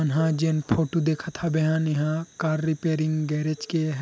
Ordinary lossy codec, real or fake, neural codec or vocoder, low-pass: none; real; none; none